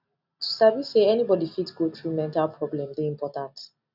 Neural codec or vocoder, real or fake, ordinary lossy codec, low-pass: none; real; none; 5.4 kHz